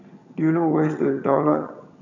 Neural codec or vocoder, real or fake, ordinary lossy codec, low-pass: vocoder, 22.05 kHz, 80 mel bands, HiFi-GAN; fake; none; 7.2 kHz